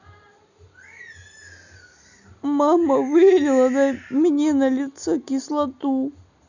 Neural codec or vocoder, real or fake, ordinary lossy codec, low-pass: none; real; none; 7.2 kHz